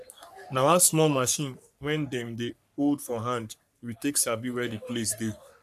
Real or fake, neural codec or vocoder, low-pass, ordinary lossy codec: fake; codec, 44.1 kHz, 3.4 kbps, Pupu-Codec; 14.4 kHz; none